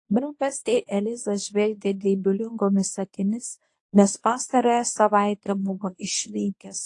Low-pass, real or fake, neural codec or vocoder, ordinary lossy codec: 10.8 kHz; fake; codec, 24 kHz, 0.9 kbps, WavTokenizer, medium speech release version 1; AAC, 48 kbps